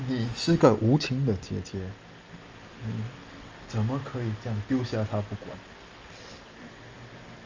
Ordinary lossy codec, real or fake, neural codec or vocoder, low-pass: Opus, 24 kbps; real; none; 7.2 kHz